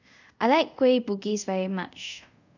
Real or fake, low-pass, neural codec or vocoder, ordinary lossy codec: fake; 7.2 kHz; codec, 24 kHz, 0.5 kbps, DualCodec; none